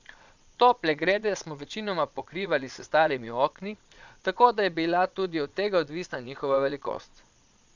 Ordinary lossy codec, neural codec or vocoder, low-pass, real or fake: none; vocoder, 22.05 kHz, 80 mel bands, Vocos; 7.2 kHz; fake